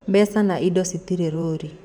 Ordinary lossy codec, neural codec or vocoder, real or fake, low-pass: none; vocoder, 44.1 kHz, 128 mel bands every 512 samples, BigVGAN v2; fake; 19.8 kHz